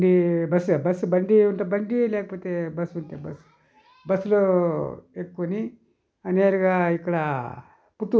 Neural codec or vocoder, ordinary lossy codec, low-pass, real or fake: none; none; none; real